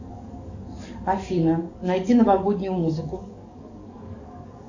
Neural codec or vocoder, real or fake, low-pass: codec, 44.1 kHz, 7.8 kbps, DAC; fake; 7.2 kHz